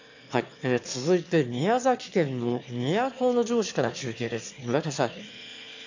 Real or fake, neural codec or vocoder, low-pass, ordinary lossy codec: fake; autoencoder, 22.05 kHz, a latent of 192 numbers a frame, VITS, trained on one speaker; 7.2 kHz; AAC, 48 kbps